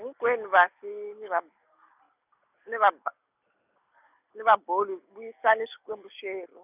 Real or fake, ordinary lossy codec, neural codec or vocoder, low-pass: real; none; none; 3.6 kHz